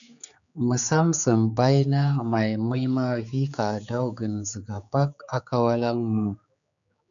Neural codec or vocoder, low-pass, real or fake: codec, 16 kHz, 4 kbps, X-Codec, HuBERT features, trained on general audio; 7.2 kHz; fake